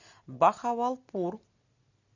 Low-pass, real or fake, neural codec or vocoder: 7.2 kHz; real; none